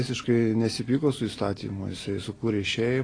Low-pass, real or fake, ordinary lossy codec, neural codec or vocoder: 9.9 kHz; real; AAC, 32 kbps; none